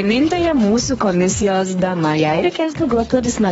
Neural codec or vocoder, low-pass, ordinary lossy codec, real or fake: codec, 32 kHz, 1.9 kbps, SNAC; 14.4 kHz; AAC, 24 kbps; fake